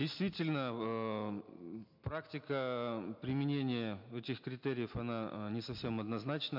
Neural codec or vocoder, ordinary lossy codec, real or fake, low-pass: none; MP3, 48 kbps; real; 5.4 kHz